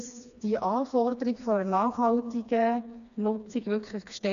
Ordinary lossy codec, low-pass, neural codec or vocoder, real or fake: none; 7.2 kHz; codec, 16 kHz, 2 kbps, FreqCodec, smaller model; fake